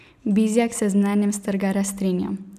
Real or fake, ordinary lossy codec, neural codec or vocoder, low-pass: real; none; none; 14.4 kHz